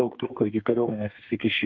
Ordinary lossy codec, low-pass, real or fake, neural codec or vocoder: MP3, 48 kbps; 7.2 kHz; fake; codec, 32 kHz, 1.9 kbps, SNAC